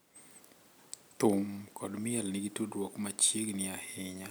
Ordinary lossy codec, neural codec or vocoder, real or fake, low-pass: none; none; real; none